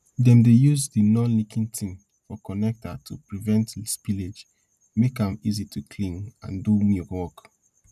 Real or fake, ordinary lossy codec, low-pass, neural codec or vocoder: real; none; 14.4 kHz; none